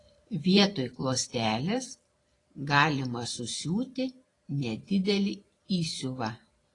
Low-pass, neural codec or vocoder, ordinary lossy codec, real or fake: 10.8 kHz; vocoder, 44.1 kHz, 128 mel bands every 512 samples, BigVGAN v2; AAC, 32 kbps; fake